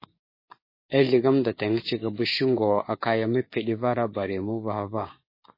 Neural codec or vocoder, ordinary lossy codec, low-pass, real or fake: codec, 16 kHz, 6 kbps, DAC; MP3, 24 kbps; 5.4 kHz; fake